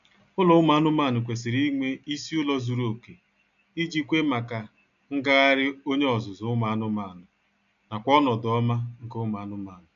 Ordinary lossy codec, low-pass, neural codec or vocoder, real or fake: none; 7.2 kHz; none; real